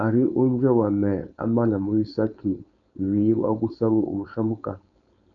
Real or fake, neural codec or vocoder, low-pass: fake; codec, 16 kHz, 4.8 kbps, FACodec; 7.2 kHz